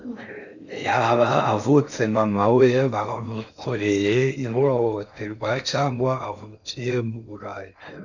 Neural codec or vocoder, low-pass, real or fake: codec, 16 kHz in and 24 kHz out, 0.6 kbps, FocalCodec, streaming, 4096 codes; 7.2 kHz; fake